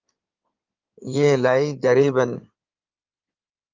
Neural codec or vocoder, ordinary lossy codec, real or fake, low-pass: codec, 16 kHz in and 24 kHz out, 2.2 kbps, FireRedTTS-2 codec; Opus, 24 kbps; fake; 7.2 kHz